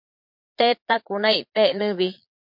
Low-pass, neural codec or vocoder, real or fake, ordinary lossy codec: 5.4 kHz; vocoder, 22.05 kHz, 80 mel bands, Vocos; fake; MP3, 48 kbps